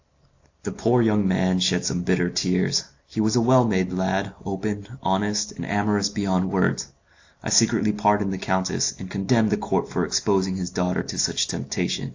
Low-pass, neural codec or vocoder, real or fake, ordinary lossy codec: 7.2 kHz; none; real; AAC, 48 kbps